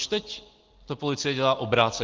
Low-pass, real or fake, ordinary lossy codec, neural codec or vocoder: 7.2 kHz; real; Opus, 16 kbps; none